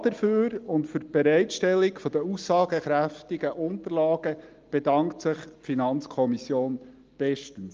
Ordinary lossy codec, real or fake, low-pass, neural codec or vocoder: Opus, 24 kbps; real; 7.2 kHz; none